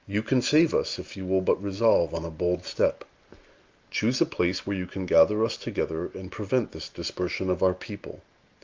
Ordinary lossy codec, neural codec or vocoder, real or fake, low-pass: Opus, 32 kbps; none; real; 7.2 kHz